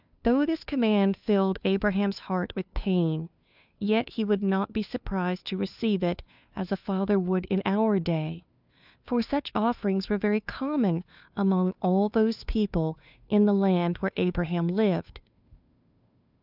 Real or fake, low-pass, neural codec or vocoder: fake; 5.4 kHz; codec, 16 kHz, 2 kbps, FunCodec, trained on Chinese and English, 25 frames a second